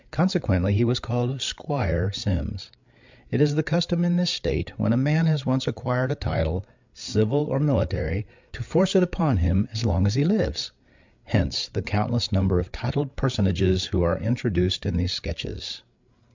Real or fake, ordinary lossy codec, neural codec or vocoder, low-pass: fake; MP3, 64 kbps; codec, 16 kHz, 8 kbps, FreqCodec, larger model; 7.2 kHz